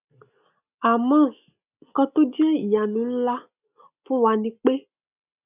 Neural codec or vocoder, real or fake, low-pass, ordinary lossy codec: none; real; 3.6 kHz; none